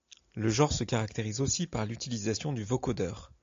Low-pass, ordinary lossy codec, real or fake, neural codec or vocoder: 7.2 kHz; AAC, 48 kbps; real; none